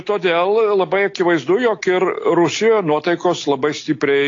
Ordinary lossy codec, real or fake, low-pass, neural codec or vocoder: AAC, 32 kbps; real; 7.2 kHz; none